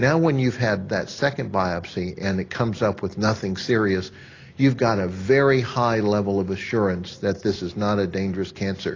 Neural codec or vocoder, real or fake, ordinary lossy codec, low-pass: none; real; AAC, 32 kbps; 7.2 kHz